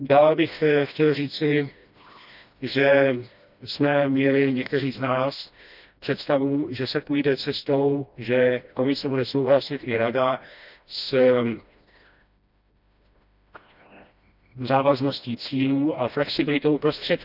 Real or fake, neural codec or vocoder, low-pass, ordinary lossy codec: fake; codec, 16 kHz, 1 kbps, FreqCodec, smaller model; 5.4 kHz; none